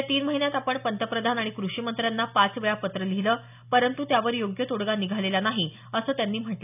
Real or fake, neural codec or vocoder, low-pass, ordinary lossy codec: real; none; 3.6 kHz; none